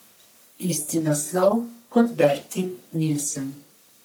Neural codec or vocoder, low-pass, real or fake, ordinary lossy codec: codec, 44.1 kHz, 1.7 kbps, Pupu-Codec; none; fake; none